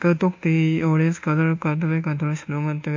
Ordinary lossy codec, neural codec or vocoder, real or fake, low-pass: MP3, 48 kbps; codec, 24 kHz, 1.2 kbps, DualCodec; fake; 7.2 kHz